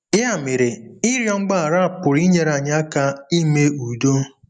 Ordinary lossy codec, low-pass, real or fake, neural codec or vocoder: none; 9.9 kHz; real; none